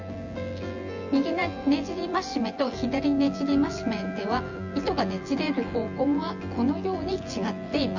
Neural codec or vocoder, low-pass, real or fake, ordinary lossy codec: vocoder, 24 kHz, 100 mel bands, Vocos; 7.2 kHz; fake; Opus, 32 kbps